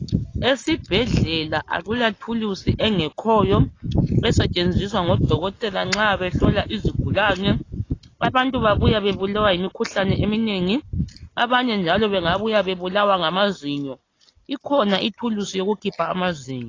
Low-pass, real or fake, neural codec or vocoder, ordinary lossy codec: 7.2 kHz; real; none; AAC, 32 kbps